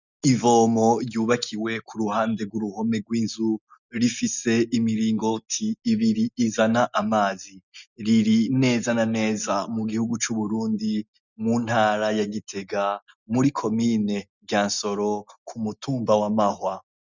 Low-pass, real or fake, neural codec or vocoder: 7.2 kHz; real; none